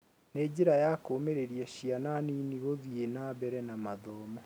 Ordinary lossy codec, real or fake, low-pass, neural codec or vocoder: none; real; none; none